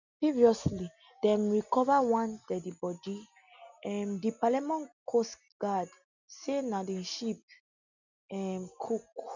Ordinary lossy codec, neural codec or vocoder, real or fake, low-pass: none; none; real; 7.2 kHz